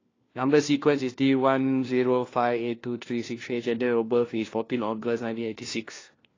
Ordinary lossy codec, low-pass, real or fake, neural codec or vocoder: AAC, 32 kbps; 7.2 kHz; fake; codec, 16 kHz, 1 kbps, FunCodec, trained on LibriTTS, 50 frames a second